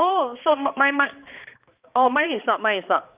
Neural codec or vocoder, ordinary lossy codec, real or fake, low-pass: codec, 16 kHz, 2 kbps, X-Codec, HuBERT features, trained on balanced general audio; Opus, 24 kbps; fake; 3.6 kHz